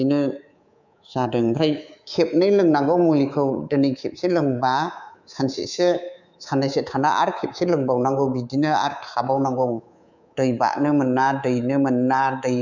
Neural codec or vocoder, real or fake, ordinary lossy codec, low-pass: codec, 24 kHz, 3.1 kbps, DualCodec; fake; none; 7.2 kHz